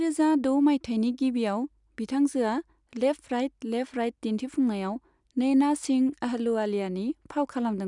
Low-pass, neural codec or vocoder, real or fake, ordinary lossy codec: 10.8 kHz; none; real; none